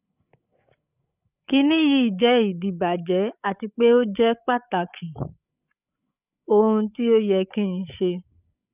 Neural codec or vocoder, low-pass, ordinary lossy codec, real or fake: codec, 16 kHz, 8 kbps, FreqCodec, larger model; 3.6 kHz; none; fake